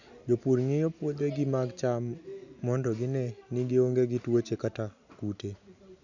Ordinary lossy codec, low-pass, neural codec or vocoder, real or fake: none; 7.2 kHz; none; real